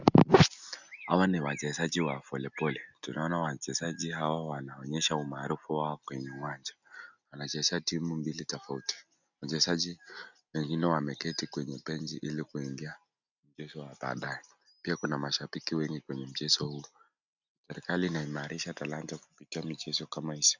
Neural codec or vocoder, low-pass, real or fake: none; 7.2 kHz; real